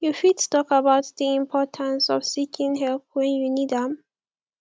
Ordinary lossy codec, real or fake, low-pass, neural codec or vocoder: none; real; none; none